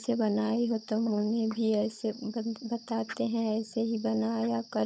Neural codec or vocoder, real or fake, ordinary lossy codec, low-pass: codec, 16 kHz, 16 kbps, FunCodec, trained on LibriTTS, 50 frames a second; fake; none; none